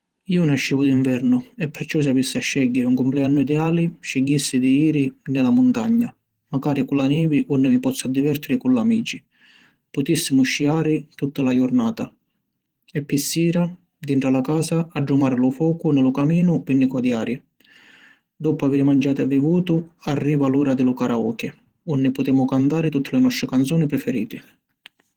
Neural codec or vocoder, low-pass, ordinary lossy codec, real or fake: vocoder, 44.1 kHz, 128 mel bands every 512 samples, BigVGAN v2; 19.8 kHz; Opus, 16 kbps; fake